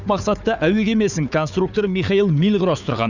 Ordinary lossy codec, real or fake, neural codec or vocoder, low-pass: none; fake; codec, 16 kHz, 4 kbps, FunCodec, trained on Chinese and English, 50 frames a second; 7.2 kHz